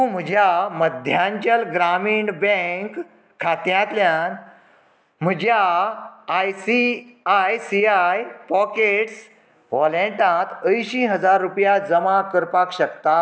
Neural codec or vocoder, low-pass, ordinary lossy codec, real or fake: none; none; none; real